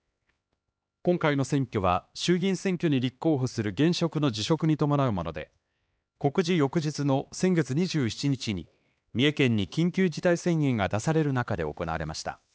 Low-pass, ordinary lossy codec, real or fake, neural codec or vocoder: none; none; fake; codec, 16 kHz, 2 kbps, X-Codec, HuBERT features, trained on LibriSpeech